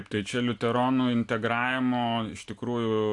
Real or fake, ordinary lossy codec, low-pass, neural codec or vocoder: real; AAC, 64 kbps; 10.8 kHz; none